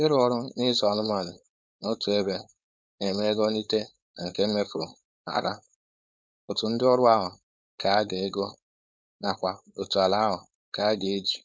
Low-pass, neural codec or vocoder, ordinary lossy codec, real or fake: none; codec, 16 kHz, 4.8 kbps, FACodec; none; fake